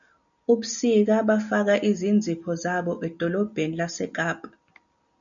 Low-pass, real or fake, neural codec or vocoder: 7.2 kHz; real; none